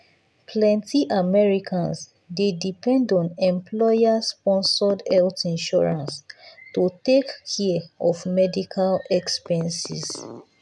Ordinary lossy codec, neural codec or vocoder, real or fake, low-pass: none; none; real; none